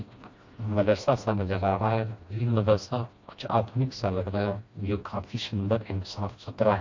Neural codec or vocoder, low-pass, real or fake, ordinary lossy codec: codec, 16 kHz, 1 kbps, FreqCodec, smaller model; 7.2 kHz; fake; MP3, 48 kbps